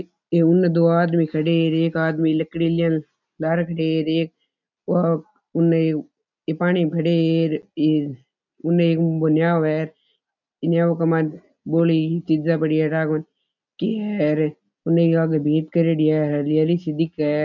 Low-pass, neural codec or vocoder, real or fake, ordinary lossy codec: 7.2 kHz; none; real; none